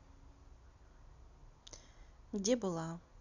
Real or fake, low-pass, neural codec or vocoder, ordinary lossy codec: real; 7.2 kHz; none; none